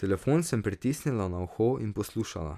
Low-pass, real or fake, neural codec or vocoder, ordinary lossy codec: 14.4 kHz; real; none; none